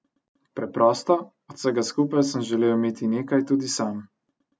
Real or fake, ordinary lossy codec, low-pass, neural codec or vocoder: real; none; none; none